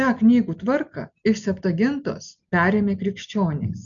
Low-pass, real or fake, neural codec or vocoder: 7.2 kHz; real; none